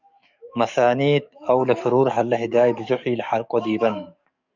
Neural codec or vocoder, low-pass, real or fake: codec, 44.1 kHz, 7.8 kbps, DAC; 7.2 kHz; fake